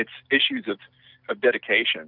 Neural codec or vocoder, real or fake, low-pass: none; real; 5.4 kHz